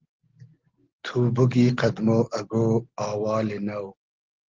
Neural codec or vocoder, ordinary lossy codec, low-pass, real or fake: none; Opus, 16 kbps; 7.2 kHz; real